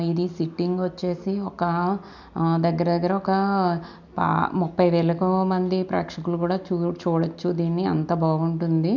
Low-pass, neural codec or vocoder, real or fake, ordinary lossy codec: 7.2 kHz; none; real; none